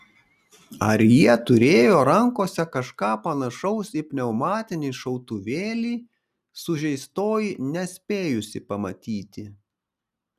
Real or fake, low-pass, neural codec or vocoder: real; 14.4 kHz; none